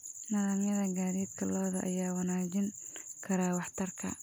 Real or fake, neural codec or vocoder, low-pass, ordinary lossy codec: real; none; none; none